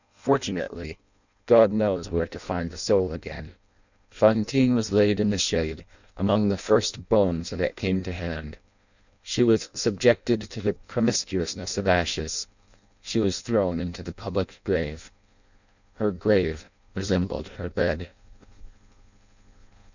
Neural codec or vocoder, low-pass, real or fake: codec, 16 kHz in and 24 kHz out, 0.6 kbps, FireRedTTS-2 codec; 7.2 kHz; fake